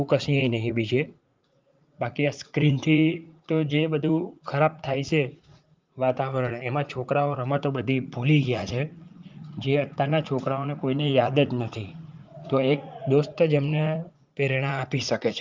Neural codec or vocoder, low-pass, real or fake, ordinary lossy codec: vocoder, 44.1 kHz, 80 mel bands, Vocos; 7.2 kHz; fake; Opus, 32 kbps